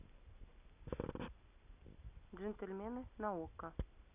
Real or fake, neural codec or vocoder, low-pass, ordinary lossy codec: real; none; 3.6 kHz; none